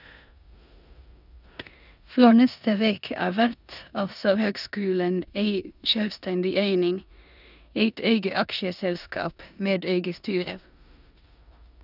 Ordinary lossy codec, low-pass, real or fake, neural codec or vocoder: none; 5.4 kHz; fake; codec, 16 kHz in and 24 kHz out, 0.9 kbps, LongCat-Audio-Codec, four codebook decoder